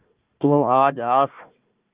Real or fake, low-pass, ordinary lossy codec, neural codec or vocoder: fake; 3.6 kHz; Opus, 32 kbps; codec, 16 kHz, 1 kbps, FunCodec, trained on Chinese and English, 50 frames a second